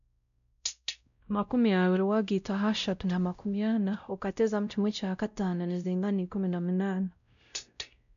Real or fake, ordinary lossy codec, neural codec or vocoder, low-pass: fake; none; codec, 16 kHz, 0.5 kbps, X-Codec, WavLM features, trained on Multilingual LibriSpeech; 7.2 kHz